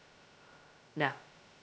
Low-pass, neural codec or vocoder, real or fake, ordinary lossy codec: none; codec, 16 kHz, 0.2 kbps, FocalCodec; fake; none